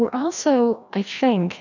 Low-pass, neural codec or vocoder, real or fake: 7.2 kHz; codec, 16 kHz, 1 kbps, FreqCodec, larger model; fake